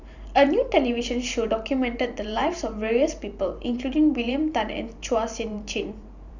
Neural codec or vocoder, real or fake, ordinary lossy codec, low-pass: vocoder, 44.1 kHz, 128 mel bands every 512 samples, BigVGAN v2; fake; none; 7.2 kHz